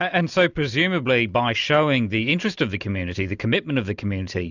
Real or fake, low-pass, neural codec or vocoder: real; 7.2 kHz; none